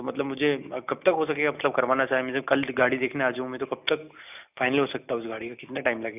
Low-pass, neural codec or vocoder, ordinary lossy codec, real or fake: 3.6 kHz; none; none; real